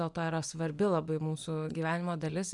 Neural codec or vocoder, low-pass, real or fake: vocoder, 24 kHz, 100 mel bands, Vocos; 10.8 kHz; fake